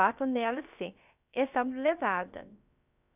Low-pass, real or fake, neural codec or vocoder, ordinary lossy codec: 3.6 kHz; fake; codec, 16 kHz, about 1 kbps, DyCAST, with the encoder's durations; none